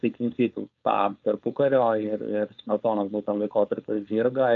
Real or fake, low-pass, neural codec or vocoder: fake; 7.2 kHz; codec, 16 kHz, 4.8 kbps, FACodec